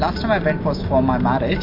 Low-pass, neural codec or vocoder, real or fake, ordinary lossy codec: 5.4 kHz; none; real; MP3, 32 kbps